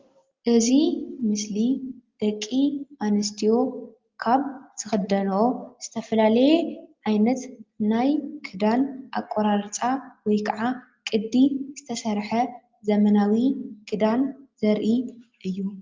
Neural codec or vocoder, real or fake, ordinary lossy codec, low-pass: none; real; Opus, 32 kbps; 7.2 kHz